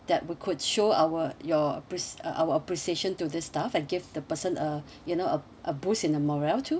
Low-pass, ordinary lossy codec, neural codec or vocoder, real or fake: none; none; none; real